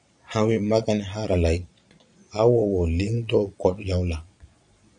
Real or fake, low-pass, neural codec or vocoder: fake; 9.9 kHz; vocoder, 22.05 kHz, 80 mel bands, Vocos